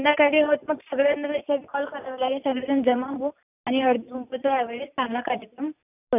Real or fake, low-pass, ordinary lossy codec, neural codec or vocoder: real; 3.6 kHz; none; none